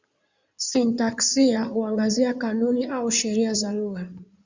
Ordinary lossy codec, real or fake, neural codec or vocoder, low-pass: Opus, 64 kbps; fake; codec, 16 kHz in and 24 kHz out, 2.2 kbps, FireRedTTS-2 codec; 7.2 kHz